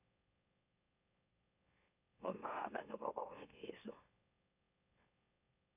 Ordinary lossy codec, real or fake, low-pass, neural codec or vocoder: none; fake; 3.6 kHz; autoencoder, 44.1 kHz, a latent of 192 numbers a frame, MeloTTS